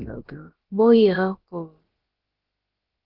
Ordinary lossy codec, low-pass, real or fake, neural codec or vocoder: Opus, 16 kbps; 5.4 kHz; fake; codec, 16 kHz, about 1 kbps, DyCAST, with the encoder's durations